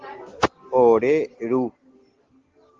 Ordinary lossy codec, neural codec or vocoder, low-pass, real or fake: Opus, 16 kbps; none; 7.2 kHz; real